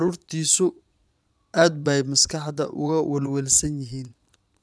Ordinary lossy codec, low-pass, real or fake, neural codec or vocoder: none; none; fake; vocoder, 22.05 kHz, 80 mel bands, Vocos